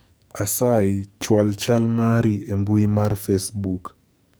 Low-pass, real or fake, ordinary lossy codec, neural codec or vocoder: none; fake; none; codec, 44.1 kHz, 2.6 kbps, SNAC